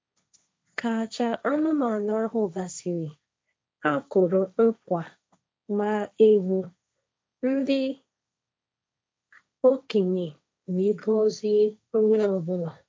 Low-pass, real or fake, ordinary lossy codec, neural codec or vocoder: none; fake; none; codec, 16 kHz, 1.1 kbps, Voila-Tokenizer